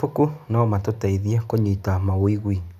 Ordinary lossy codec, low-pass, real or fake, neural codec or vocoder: none; 14.4 kHz; real; none